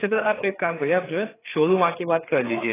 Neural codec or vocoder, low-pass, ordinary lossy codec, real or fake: codec, 16 kHz, 4 kbps, FreqCodec, larger model; 3.6 kHz; AAC, 16 kbps; fake